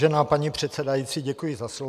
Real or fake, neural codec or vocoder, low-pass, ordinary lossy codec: real; none; 14.4 kHz; AAC, 96 kbps